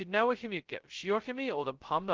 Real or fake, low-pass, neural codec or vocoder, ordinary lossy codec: fake; 7.2 kHz; codec, 16 kHz, 0.2 kbps, FocalCodec; Opus, 16 kbps